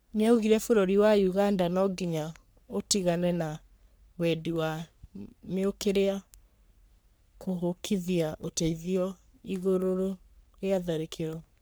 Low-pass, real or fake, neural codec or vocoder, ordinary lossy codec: none; fake; codec, 44.1 kHz, 3.4 kbps, Pupu-Codec; none